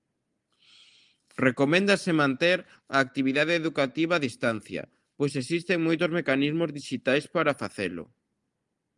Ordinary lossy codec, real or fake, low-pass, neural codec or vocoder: Opus, 32 kbps; real; 10.8 kHz; none